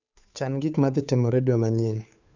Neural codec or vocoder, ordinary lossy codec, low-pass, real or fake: codec, 16 kHz, 2 kbps, FunCodec, trained on Chinese and English, 25 frames a second; none; 7.2 kHz; fake